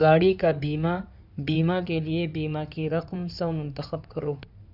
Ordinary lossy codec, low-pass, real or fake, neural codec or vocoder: none; 5.4 kHz; fake; codec, 16 kHz in and 24 kHz out, 2.2 kbps, FireRedTTS-2 codec